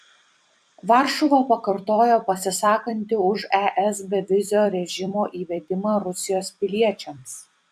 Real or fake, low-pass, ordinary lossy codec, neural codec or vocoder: fake; 14.4 kHz; AAC, 96 kbps; vocoder, 44.1 kHz, 128 mel bands every 256 samples, BigVGAN v2